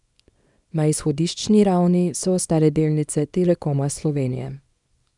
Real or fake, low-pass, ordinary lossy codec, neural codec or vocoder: fake; 10.8 kHz; none; codec, 24 kHz, 0.9 kbps, WavTokenizer, small release